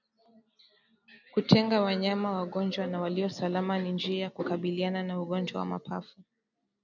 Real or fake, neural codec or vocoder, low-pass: real; none; 7.2 kHz